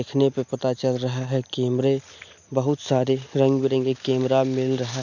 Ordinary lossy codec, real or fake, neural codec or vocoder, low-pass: MP3, 64 kbps; real; none; 7.2 kHz